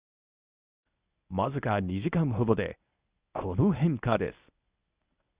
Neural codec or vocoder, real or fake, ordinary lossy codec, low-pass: codec, 24 kHz, 0.9 kbps, WavTokenizer, medium speech release version 1; fake; Opus, 64 kbps; 3.6 kHz